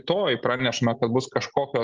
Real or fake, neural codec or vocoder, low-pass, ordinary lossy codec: real; none; 7.2 kHz; Opus, 32 kbps